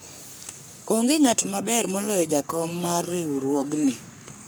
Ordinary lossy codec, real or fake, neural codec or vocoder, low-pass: none; fake; codec, 44.1 kHz, 3.4 kbps, Pupu-Codec; none